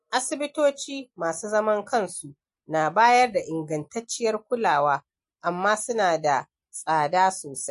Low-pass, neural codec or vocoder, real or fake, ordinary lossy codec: 14.4 kHz; none; real; MP3, 48 kbps